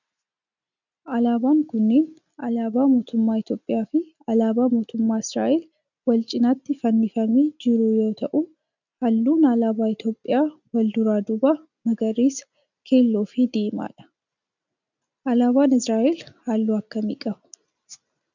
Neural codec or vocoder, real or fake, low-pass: none; real; 7.2 kHz